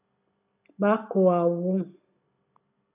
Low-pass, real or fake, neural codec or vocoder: 3.6 kHz; real; none